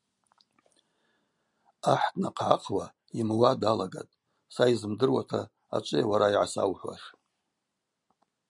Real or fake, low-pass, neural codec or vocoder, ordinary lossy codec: real; 10.8 kHz; none; MP3, 96 kbps